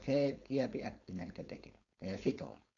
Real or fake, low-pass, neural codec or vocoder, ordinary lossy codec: fake; 7.2 kHz; codec, 16 kHz, 4.8 kbps, FACodec; none